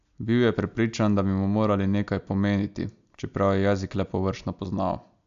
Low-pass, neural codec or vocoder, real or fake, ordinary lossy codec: 7.2 kHz; none; real; AAC, 96 kbps